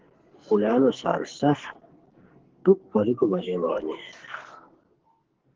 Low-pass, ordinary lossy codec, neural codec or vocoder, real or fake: 7.2 kHz; Opus, 16 kbps; codec, 44.1 kHz, 2.6 kbps, SNAC; fake